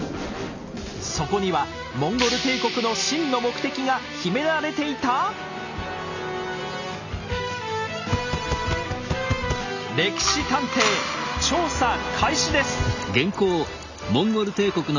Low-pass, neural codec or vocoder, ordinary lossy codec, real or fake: 7.2 kHz; none; none; real